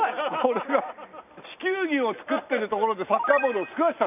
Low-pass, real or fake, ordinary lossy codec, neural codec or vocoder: 3.6 kHz; real; none; none